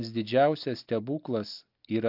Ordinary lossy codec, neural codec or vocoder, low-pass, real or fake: AAC, 48 kbps; none; 5.4 kHz; real